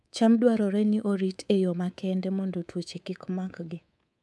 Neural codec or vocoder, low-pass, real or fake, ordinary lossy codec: codec, 24 kHz, 3.1 kbps, DualCodec; none; fake; none